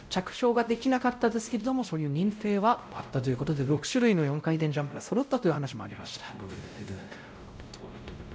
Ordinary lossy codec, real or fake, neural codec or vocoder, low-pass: none; fake; codec, 16 kHz, 0.5 kbps, X-Codec, WavLM features, trained on Multilingual LibriSpeech; none